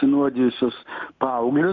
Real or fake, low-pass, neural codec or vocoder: real; 7.2 kHz; none